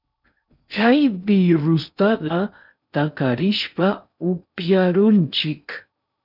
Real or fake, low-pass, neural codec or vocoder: fake; 5.4 kHz; codec, 16 kHz in and 24 kHz out, 0.6 kbps, FocalCodec, streaming, 2048 codes